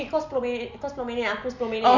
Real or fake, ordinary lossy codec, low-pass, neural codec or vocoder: real; none; 7.2 kHz; none